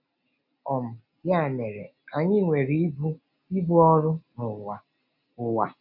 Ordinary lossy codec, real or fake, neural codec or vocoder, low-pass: none; real; none; 5.4 kHz